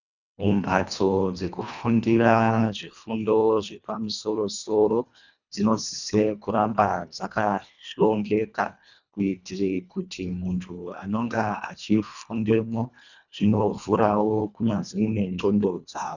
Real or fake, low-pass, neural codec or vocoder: fake; 7.2 kHz; codec, 24 kHz, 1.5 kbps, HILCodec